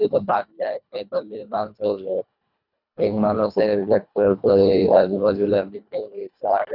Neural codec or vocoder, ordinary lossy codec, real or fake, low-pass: codec, 24 kHz, 1.5 kbps, HILCodec; none; fake; 5.4 kHz